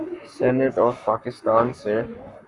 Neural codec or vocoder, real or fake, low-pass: codec, 44.1 kHz, 7.8 kbps, Pupu-Codec; fake; 10.8 kHz